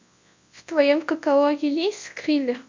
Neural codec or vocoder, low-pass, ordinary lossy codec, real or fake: codec, 24 kHz, 0.9 kbps, WavTokenizer, large speech release; 7.2 kHz; MP3, 64 kbps; fake